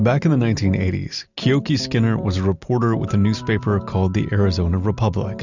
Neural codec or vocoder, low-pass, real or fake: none; 7.2 kHz; real